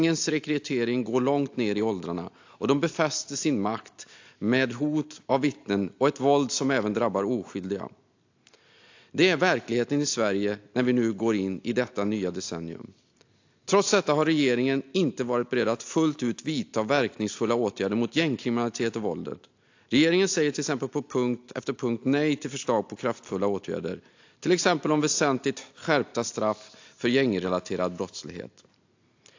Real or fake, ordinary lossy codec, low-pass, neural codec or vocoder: real; AAC, 48 kbps; 7.2 kHz; none